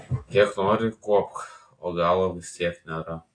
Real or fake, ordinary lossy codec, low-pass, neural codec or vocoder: real; AAC, 48 kbps; 9.9 kHz; none